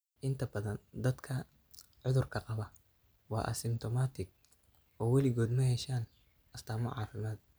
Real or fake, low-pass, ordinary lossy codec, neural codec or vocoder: real; none; none; none